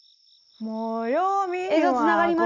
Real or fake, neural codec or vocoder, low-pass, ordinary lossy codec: real; none; 7.2 kHz; none